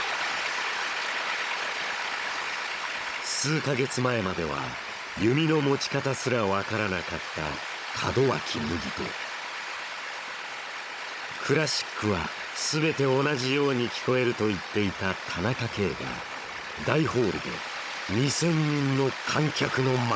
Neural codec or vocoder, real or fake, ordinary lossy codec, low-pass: codec, 16 kHz, 16 kbps, FunCodec, trained on Chinese and English, 50 frames a second; fake; none; none